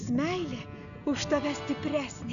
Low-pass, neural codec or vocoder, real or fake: 7.2 kHz; none; real